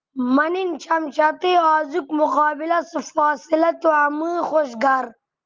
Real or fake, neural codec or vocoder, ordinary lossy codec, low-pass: real; none; Opus, 24 kbps; 7.2 kHz